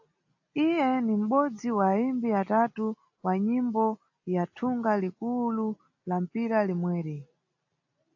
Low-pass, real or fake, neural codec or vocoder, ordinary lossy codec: 7.2 kHz; real; none; AAC, 48 kbps